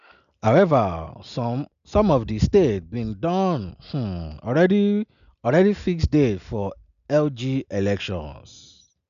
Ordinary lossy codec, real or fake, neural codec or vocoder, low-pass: none; real; none; 7.2 kHz